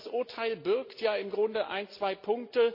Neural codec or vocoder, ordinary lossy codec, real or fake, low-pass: none; MP3, 32 kbps; real; 5.4 kHz